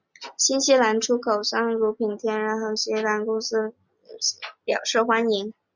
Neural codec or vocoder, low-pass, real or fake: none; 7.2 kHz; real